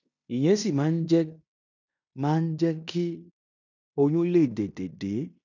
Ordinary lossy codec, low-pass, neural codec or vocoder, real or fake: none; 7.2 kHz; codec, 16 kHz in and 24 kHz out, 0.9 kbps, LongCat-Audio-Codec, fine tuned four codebook decoder; fake